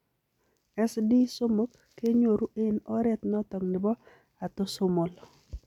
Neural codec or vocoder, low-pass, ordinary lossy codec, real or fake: none; 19.8 kHz; none; real